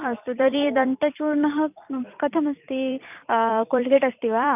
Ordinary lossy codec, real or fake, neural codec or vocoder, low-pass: none; fake; vocoder, 44.1 kHz, 128 mel bands every 256 samples, BigVGAN v2; 3.6 kHz